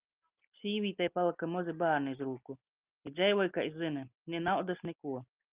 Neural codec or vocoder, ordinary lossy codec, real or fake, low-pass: none; Opus, 24 kbps; real; 3.6 kHz